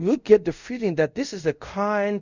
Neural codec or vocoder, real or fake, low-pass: codec, 24 kHz, 0.5 kbps, DualCodec; fake; 7.2 kHz